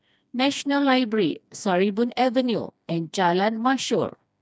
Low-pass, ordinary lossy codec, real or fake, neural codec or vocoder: none; none; fake; codec, 16 kHz, 2 kbps, FreqCodec, smaller model